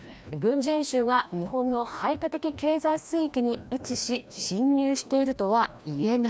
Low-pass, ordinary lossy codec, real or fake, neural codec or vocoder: none; none; fake; codec, 16 kHz, 1 kbps, FreqCodec, larger model